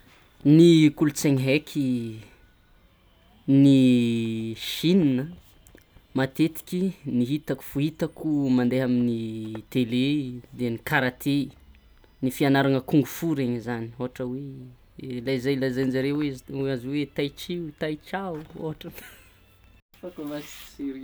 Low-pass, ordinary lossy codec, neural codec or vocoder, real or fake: none; none; none; real